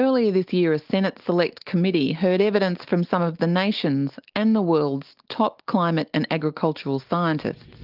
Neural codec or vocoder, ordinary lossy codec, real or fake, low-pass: none; Opus, 32 kbps; real; 5.4 kHz